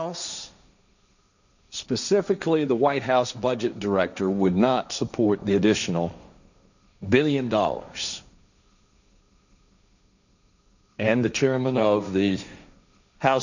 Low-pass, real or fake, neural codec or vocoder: 7.2 kHz; fake; codec, 16 kHz, 1.1 kbps, Voila-Tokenizer